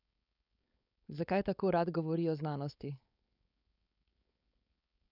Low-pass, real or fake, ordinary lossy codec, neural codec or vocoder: 5.4 kHz; fake; none; codec, 16 kHz, 4.8 kbps, FACodec